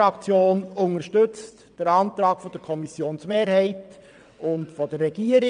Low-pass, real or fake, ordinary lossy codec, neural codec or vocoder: 9.9 kHz; fake; none; vocoder, 22.05 kHz, 80 mel bands, WaveNeXt